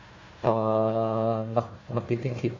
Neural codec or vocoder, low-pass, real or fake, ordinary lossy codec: codec, 16 kHz, 1 kbps, FunCodec, trained on Chinese and English, 50 frames a second; 7.2 kHz; fake; MP3, 32 kbps